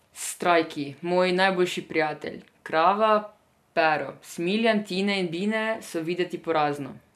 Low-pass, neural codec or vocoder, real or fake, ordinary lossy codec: 14.4 kHz; none; real; AAC, 96 kbps